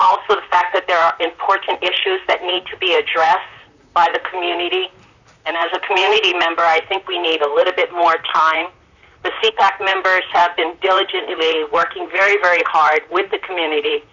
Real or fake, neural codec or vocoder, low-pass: fake; vocoder, 44.1 kHz, 128 mel bands, Pupu-Vocoder; 7.2 kHz